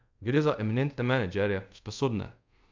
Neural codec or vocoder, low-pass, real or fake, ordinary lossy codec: codec, 24 kHz, 0.5 kbps, DualCodec; 7.2 kHz; fake; MP3, 64 kbps